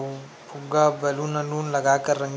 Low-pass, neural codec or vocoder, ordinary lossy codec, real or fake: none; none; none; real